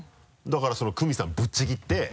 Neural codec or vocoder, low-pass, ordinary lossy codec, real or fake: none; none; none; real